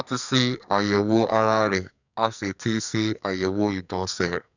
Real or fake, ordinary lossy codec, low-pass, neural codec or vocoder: fake; none; 7.2 kHz; codec, 44.1 kHz, 2.6 kbps, SNAC